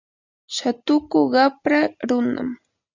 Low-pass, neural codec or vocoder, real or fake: 7.2 kHz; none; real